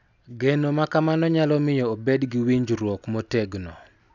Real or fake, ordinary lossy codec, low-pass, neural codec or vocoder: real; none; 7.2 kHz; none